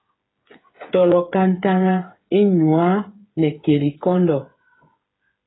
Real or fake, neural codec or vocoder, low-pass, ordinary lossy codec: fake; codec, 16 kHz, 8 kbps, FreqCodec, smaller model; 7.2 kHz; AAC, 16 kbps